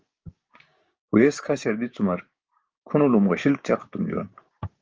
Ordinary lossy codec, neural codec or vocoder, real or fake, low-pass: Opus, 24 kbps; codec, 16 kHz, 6 kbps, DAC; fake; 7.2 kHz